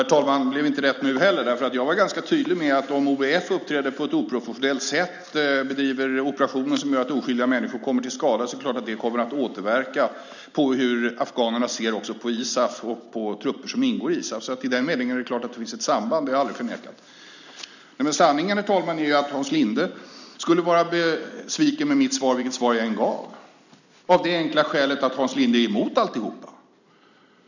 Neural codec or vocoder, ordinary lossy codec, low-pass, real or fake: none; none; 7.2 kHz; real